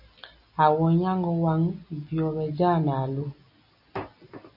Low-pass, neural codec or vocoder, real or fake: 5.4 kHz; none; real